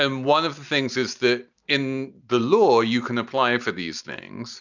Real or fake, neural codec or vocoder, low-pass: real; none; 7.2 kHz